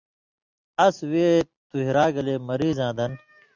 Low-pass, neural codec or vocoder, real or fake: 7.2 kHz; none; real